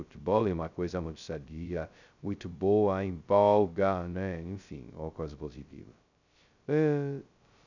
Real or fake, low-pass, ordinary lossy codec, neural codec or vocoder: fake; 7.2 kHz; none; codec, 16 kHz, 0.2 kbps, FocalCodec